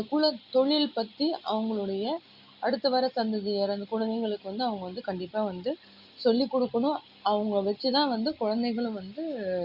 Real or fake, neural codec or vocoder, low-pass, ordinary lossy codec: real; none; 5.4 kHz; Opus, 64 kbps